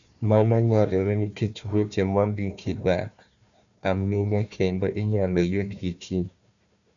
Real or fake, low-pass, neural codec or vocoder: fake; 7.2 kHz; codec, 16 kHz, 1 kbps, FunCodec, trained on Chinese and English, 50 frames a second